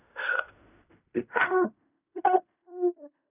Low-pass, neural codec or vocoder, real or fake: 3.6 kHz; codec, 16 kHz in and 24 kHz out, 0.4 kbps, LongCat-Audio-Codec, fine tuned four codebook decoder; fake